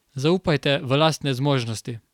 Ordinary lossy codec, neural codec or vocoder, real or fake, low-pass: none; none; real; 19.8 kHz